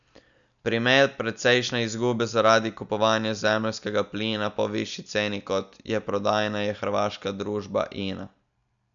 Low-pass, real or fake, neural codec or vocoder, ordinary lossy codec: 7.2 kHz; real; none; none